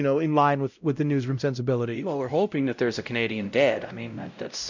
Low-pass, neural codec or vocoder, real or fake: 7.2 kHz; codec, 16 kHz, 0.5 kbps, X-Codec, WavLM features, trained on Multilingual LibriSpeech; fake